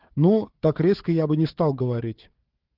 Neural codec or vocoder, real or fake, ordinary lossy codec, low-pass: codec, 16 kHz, 4 kbps, FunCodec, trained on Chinese and English, 50 frames a second; fake; Opus, 16 kbps; 5.4 kHz